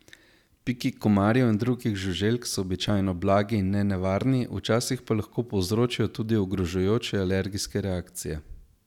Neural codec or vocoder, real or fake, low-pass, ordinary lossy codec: none; real; 19.8 kHz; none